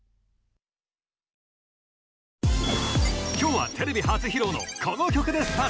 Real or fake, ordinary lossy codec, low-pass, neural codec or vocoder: real; none; none; none